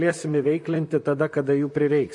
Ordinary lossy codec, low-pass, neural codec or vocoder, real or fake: MP3, 48 kbps; 10.8 kHz; vocoder, 44.1 kHz, 128 mel bands, Pupu-Vocoder; fake